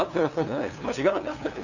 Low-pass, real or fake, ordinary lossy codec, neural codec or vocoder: 7.2 kHz; fake; none; codec, 16 kHz, 2 kbps, FunCodec, trained on LibriTTS, 25 frames a second